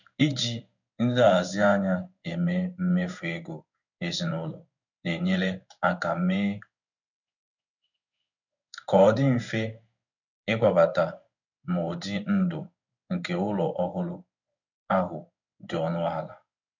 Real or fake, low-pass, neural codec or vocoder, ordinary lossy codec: fake; 7.2 kHz; codec, 16 kHz in and 24 kHz out, 1 kbps, XY-Tokenizer; none